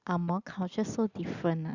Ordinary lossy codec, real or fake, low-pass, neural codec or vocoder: Opus, 64 kbps; real; 7.2 kHz; none